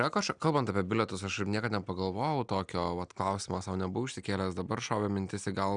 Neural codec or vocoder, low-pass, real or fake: none; 9.9 kHz; real